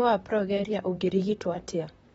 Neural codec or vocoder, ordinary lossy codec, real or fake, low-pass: vocoder, 44.1 kHz, 128 mel bands, Pupu-Vocoder; AAC, 24 kbps; fake; 19.8 kHz